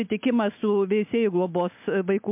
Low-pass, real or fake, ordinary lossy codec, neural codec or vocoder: 3.6 kHz; real; MP3, 32 kbps; none